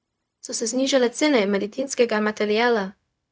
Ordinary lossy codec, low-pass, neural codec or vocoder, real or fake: none; none; codec, 16 kHz, 0.4 kbps, LongCat-Audio-Codec; fake